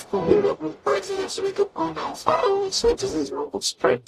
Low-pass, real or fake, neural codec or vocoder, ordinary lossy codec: 14.4 kHz; fake; codec, 44.1 kHz, 0.9 kbps, DAC; AAC, 48 kbps